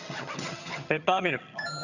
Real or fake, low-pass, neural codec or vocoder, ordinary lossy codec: fake; 7.2 kHz; vocoder, 22.05 kHz, 80 mel bands, HiFi-GAN; none